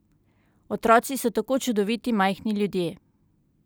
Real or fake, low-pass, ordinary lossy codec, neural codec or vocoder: real; none; none; none